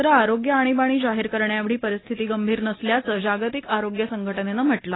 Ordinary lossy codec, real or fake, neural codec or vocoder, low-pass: AAC, 16 kbps; real; none; 7.2 kHz